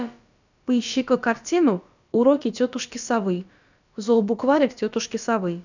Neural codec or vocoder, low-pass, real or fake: codec, 16 kHz, about 1 kbps, DyCAST, with the encoder's durations; 7.2 kHz; fake